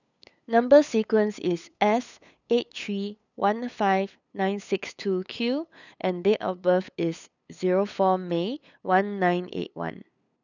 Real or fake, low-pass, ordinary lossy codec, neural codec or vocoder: fake; 7.2 kHz; none; codec, 16 kHz, 8 kbps, FunCodec, trained on LibriTTS, 25 frames a second